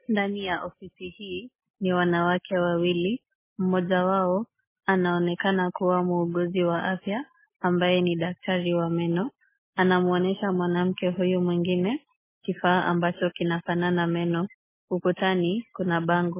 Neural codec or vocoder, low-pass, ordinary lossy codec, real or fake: none; 3.6 kHz; MP3, 16 kbps; real